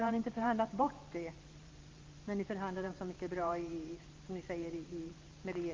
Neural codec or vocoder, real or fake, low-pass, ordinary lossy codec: vocoder, 44.1 kHz, 128 mel bands every 512 samples, BigVGAN v2; fake; 7.2 kHz; Opus, 24 kbps